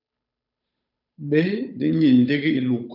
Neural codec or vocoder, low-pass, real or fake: codec, 16 kHz, 8 kbps, FunCodec, trained on Chinese and English, 25 frames a second; 5.4 kHz; fake